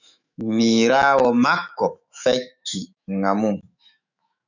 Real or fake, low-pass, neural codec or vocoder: fake; 7.2 kHz; autoencoder, 48 kHz, 128 numbers a frame, DAC-VAE, trained on Japanese speech